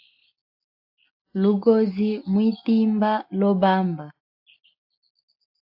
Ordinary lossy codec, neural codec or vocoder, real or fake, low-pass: AAC, 48 kbps; none; real; 5.4 kHz